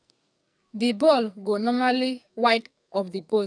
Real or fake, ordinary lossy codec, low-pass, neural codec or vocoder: fake; none; 9.9 kHz; codec, 44.1 kHz, 2.6 kbps, SNAC